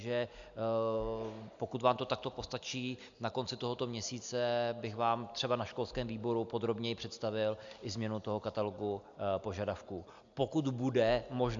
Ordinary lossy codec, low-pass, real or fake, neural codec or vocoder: MP3, 64 kbps; 7.2 kHz; real; none